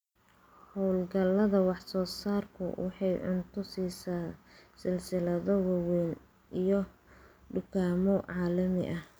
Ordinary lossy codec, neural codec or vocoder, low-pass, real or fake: none; none; none; real